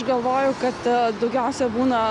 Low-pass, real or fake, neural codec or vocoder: 10.8 kHz; real; none